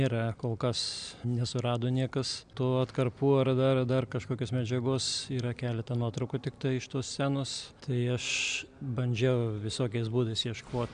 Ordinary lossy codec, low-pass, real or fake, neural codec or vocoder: MP3, 96 kbps; 9.9 kHz; real; none